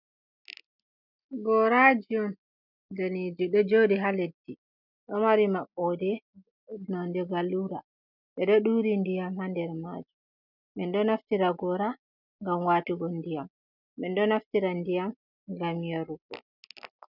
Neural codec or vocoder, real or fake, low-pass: none; real; 5.4 kHz